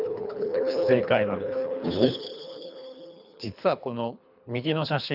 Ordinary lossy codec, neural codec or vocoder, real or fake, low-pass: none; codec, 24 kHz, 3 kbps, HILCodec; fake; 5.4 kHz